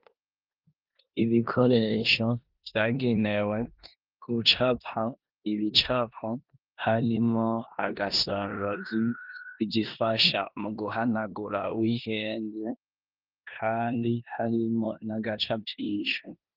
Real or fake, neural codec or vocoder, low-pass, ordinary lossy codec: fake; codec, 16 kHz in and 24 kHz out, 0.9 kbps, LongCat-Audio-Codec, four codebook decoder; 5.4 kHz; Opus, 24 kbps